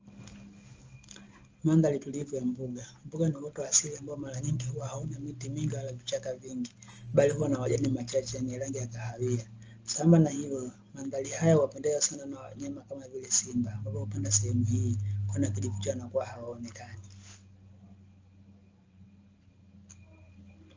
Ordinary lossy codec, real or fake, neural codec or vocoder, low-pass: Opus, 24 kbps; real; none; 7.2 kHz